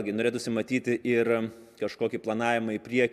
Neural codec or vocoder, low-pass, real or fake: none; 14.4 kHz; real